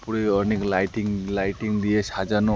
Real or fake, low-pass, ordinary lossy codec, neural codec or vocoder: real; none; none; none